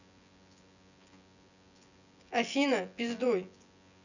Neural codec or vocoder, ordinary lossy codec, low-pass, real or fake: vocoder, 24 kHz, 100 mel bands, Vocos; none; 7.2 kHz; fake